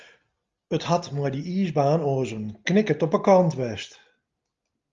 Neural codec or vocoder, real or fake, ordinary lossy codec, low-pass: none; real; Opus, 32 kbps; 7.2 kHz